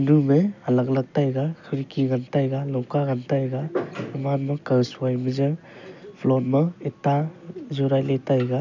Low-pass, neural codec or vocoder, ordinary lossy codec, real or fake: 7.2 kHz; none; none; real